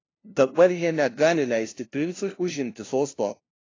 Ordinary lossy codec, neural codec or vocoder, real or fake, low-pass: AAC, 32 kbps; codec, 16 kHz, 0.5 kbps, FunCodec, trained on LibriTTS, 25 frames a second; fake; 7.2 kHz